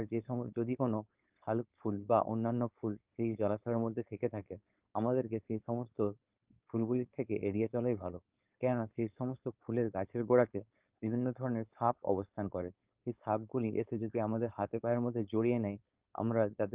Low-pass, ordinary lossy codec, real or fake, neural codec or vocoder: 3.6 kHz; Opus, 24 kbps; fake; codec, 16 kHz, 4.8 kbps, FACodec